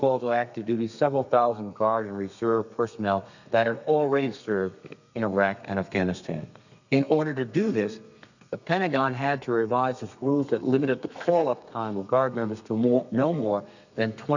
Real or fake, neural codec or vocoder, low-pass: fake; codec, 32 kHz, 1.9 kbps, SNAC; 7.2 kHz